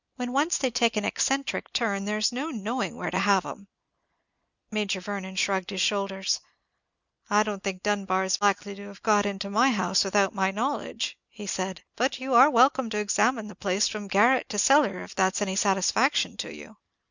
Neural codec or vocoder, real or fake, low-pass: none; real; 7.2 kHz